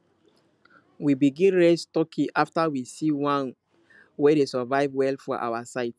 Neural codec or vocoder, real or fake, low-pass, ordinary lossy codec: none; real; none; none